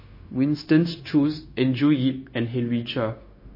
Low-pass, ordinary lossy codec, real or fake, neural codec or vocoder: 5.4 kHz; MP3, 24 kbps; fake; codec, 16 kHz, 0.9 kbps, LongCat-Audio-Codec